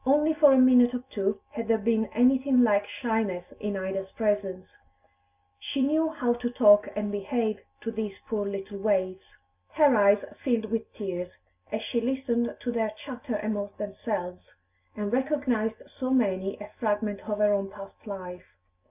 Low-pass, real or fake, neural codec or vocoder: 3.6 kHz; real; none